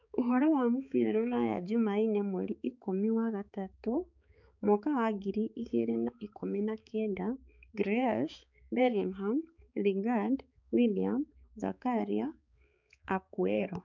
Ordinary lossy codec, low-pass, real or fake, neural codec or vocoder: none; 7.2 kHz; fake; codec, 16 kHz, 4 kbps, X-Codec, HuBERT features, trained on balanced general audio